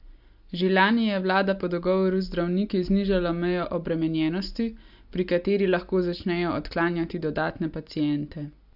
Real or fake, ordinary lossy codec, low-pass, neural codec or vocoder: real; AAC, 48 kbps; 5.4 kHz; none